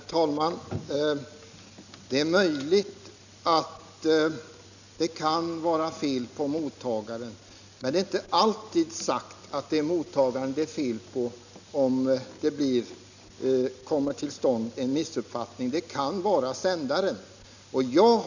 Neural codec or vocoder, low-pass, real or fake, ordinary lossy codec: none; 7.2 kHz; real; none